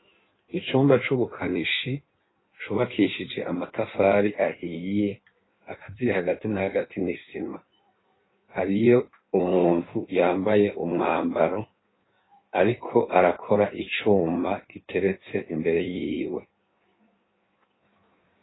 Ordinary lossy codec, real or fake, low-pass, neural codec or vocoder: AAC, 16 kbps; fake; 7.2 kHz; codec, 16 kHz in and 24 kHz out, 1.1 kbps, FireRedTTS-2 codec